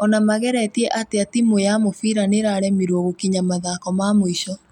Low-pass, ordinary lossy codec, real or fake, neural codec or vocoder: 19.8 kHz; none; real; none